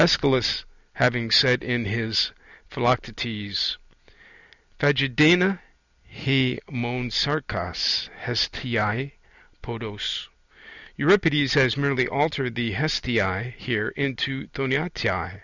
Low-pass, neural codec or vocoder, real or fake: 7.2 kHz; none; real